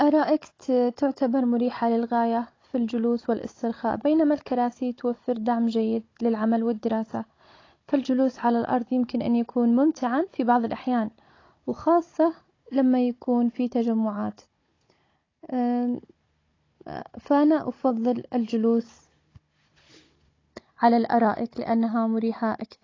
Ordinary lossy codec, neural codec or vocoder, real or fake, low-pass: AAC, 32 kbps; codec, 16 kHz, 16 kbps, FunCodec, trained on Chinese and English, 50 frames a second; fake; 7.2 kHz